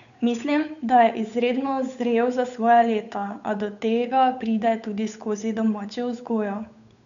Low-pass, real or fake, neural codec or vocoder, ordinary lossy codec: 7.2 kHz; fake; codec, 16 kHz, 8 kbps, FunCodec, trained on Chinese and English, 25 frames a second; none